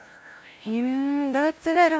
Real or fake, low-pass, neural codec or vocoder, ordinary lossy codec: fake; none; codec, 16 kHz, 0.5 kbps, FunCodec, trained on LibriTTS, 25 frames a second; none